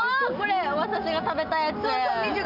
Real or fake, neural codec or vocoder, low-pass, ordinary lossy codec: real; none; 5.4 kHz; none